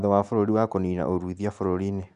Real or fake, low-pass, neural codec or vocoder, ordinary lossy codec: real; 10.8 kHz; none; none